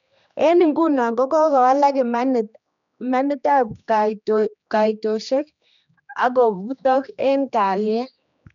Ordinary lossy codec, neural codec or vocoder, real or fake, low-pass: none; codec, 16 kHz, 2 kbps, X-Codec, HuBERT features, trained on general audio; fake; 7.2 kHz